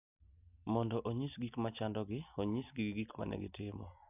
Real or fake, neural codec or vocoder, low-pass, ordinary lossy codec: real; none; 3.6 kHz; none